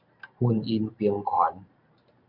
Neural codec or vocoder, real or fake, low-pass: vocoder, 44.1 kHz, 128 mel bands every 512 samples, BigVGAN v2; fake; 5.4 kHz